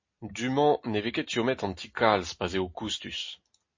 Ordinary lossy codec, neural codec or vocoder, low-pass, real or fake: MP3, 32 kbps; none; 7.2 kHz; real